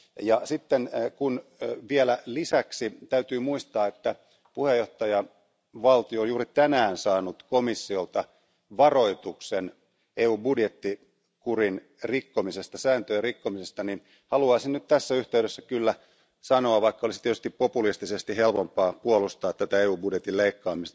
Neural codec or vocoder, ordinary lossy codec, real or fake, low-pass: none; none; real; none